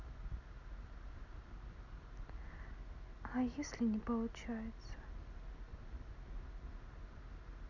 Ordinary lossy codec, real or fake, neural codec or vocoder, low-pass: none; real; none; 7.2 kHz